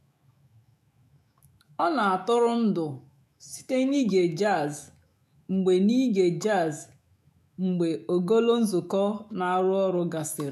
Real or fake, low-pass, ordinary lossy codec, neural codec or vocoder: fake; 14.4 kHz; none; autoencoder, 48 kHz, 128 numbers a frame, DAC-VAE, trained on Japanese speech